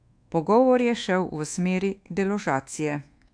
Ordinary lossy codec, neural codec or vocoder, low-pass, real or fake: AAC, 64 kbps; codec, 24 kHz, 1.2 kbps, DualCodec; 9.9 kHz; fake